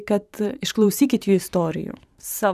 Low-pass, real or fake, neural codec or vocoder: 14.4 kHz; fake; vocoder, 44.1 kHz, 128 mel bands every 512 samples, BigVGAN v2